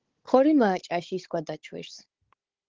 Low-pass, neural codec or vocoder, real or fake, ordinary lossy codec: 7.2 kHz; codec, 16 kHz, 16 kbps, FunCodec, trained on Chinese and English, 50 frames a second; fake; Opus, 16 kbps